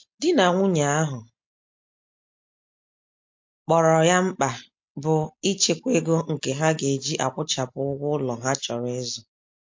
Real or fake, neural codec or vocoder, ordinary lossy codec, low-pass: real; none; MP3, 48 kbps; 7.2 kHz